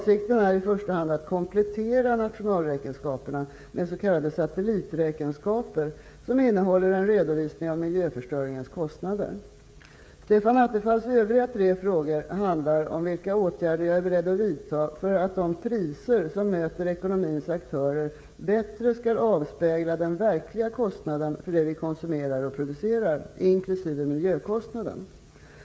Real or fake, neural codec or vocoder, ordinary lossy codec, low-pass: fake; codec, 16 kHz, 16 kbps, FreqCodec, smaller model; none; none